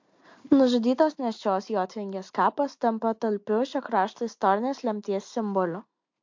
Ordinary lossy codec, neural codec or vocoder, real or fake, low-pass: MP3, 48 kbps; none; real; 7.2 kHz